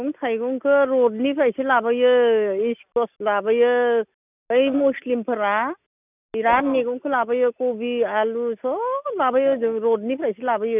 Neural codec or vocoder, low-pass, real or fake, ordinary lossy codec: none; 3.6 kHz; real; none